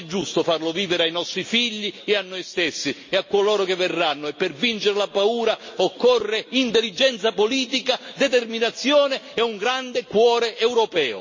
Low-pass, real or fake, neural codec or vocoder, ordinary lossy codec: 7.2 kHz; real; none; MP3, 32 kbps